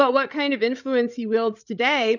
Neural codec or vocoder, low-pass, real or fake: codec, 16 kHz, 16 kbps, FreqCodec, larger model; 7.2 kHz; fake